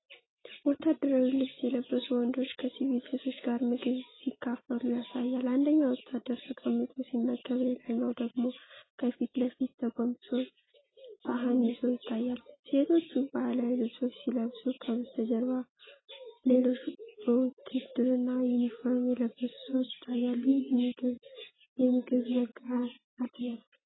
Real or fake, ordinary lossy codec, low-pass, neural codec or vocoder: real; AAC, 16 kbps; 7.2 kHz; none